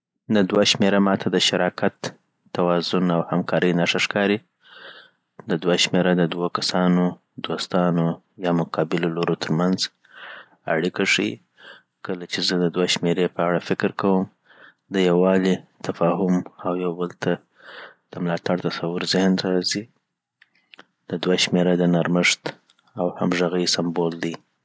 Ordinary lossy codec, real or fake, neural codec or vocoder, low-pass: none; real; none; none